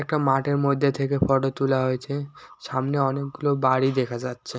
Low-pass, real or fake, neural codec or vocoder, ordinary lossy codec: none; real; none; none